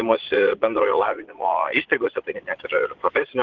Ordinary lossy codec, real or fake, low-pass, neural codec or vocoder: Opus, 16 kbps; fake; 7.2 kHz; vocoder, 22.05 kHz, 80 mel bands, Vocos